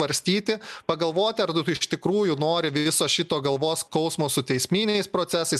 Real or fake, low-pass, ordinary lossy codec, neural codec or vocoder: real; 14.4 kHz; MP3, 96 kbps; none